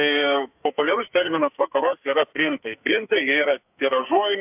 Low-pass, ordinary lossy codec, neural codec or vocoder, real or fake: 3.6 kHz; AAC, 32 kbps; codec, 44.1 kHz, 2.6 kbps, SNAC; fake